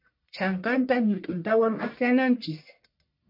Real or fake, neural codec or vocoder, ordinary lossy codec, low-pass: fake; codec, 44.1 kHz, 1.7 kbps, Pupu-Codec; MP3, 32 kbps; 5.4 kHz